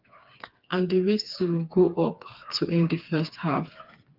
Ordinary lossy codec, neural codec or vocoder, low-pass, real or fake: Opus, 32 kbps; codec, 16 kHz, 4 kbps, FreqCodec, smaller model; 5.4 kHz; fake